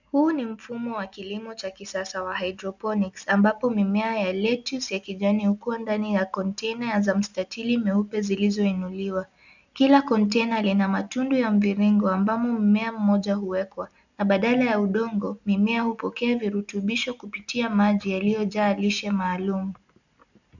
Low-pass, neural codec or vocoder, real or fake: 7.2 kHz; none; real